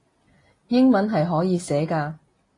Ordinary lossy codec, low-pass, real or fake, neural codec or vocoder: AAC, 32 kbps; 10.8 kHz; real; none